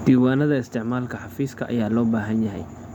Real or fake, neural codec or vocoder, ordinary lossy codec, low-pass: real; none; none; 19.8 kHz